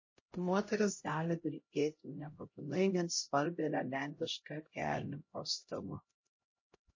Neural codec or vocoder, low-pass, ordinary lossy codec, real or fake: codec, 16 kHz, 0.5 kbps, X-Codec, HuBERT features, trained on LibriSpeech; 7.2 kHz; MP3, 32 kbps; fake